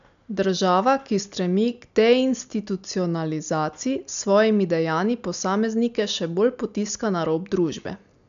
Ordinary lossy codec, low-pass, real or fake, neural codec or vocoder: MP3, 96 kbps; 7.2 kHz; real; none